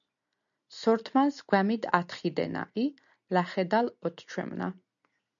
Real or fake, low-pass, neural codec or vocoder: real; 7.2 kHz; none